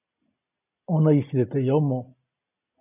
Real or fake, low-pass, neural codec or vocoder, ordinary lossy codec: real; 3.6 kHz; none; AAC, 32 kbps